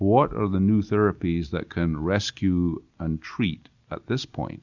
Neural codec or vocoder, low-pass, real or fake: none; 7.2 kHz; real